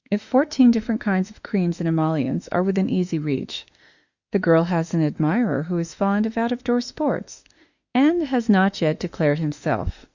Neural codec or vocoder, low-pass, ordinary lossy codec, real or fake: autoencoder, 48 kHz, 32 numbers a frame, DAC-VAE, trained on Japanese speech; 7.2 kHz; Opus, 64 kbps; fake